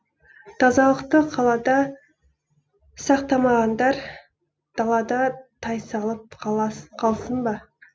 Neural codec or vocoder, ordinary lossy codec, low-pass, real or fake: none; none; none; real